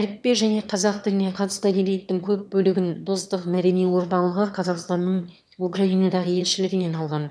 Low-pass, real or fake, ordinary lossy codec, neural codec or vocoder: none; fake; none; autoencoder, 22.05 kHz, a latent of 192 numbers a frame, VITS, trained on one speaker